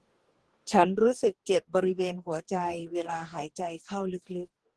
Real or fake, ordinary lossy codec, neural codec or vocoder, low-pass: fake; Opus, 16 kbps; codec, 44.1 kHz, 2.6 kbps, DAC; 10.8 kHz